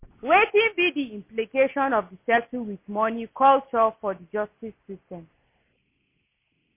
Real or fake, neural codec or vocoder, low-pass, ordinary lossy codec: real; none; 3.6 kHz; MP3, 24 kbps